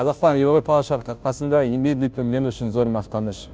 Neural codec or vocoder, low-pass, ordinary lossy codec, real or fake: codec, 16 kHz, 0.5 kbps, FunCodec, trained on Chinese and English, 25 frames a second; none; none; fake